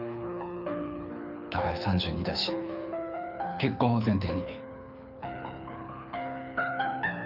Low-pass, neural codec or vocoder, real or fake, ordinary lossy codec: 5.4 kHz; codec, 24 kHz, 6 kbps, HILCodec; fake; none